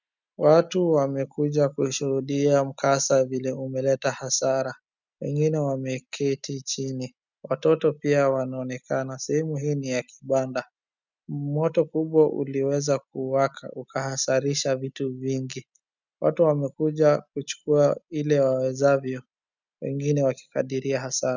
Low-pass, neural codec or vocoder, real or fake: 7.2 kHz; none; real